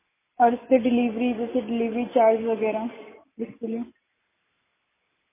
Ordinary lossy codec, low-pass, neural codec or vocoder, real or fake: MP3, 16 kbps; 3.6 kHz; none; real